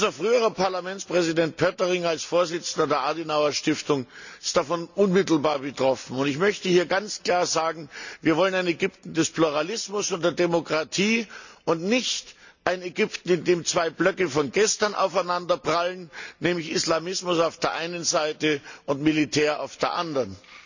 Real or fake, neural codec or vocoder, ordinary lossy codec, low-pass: real; none; none; 7.2 kHz